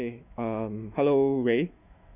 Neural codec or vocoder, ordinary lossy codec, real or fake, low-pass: autoencoder, 48 kHz, 128 numbers a frame, DAC-VAE, trained on Japanese speech; none; fake; 3.6 kHz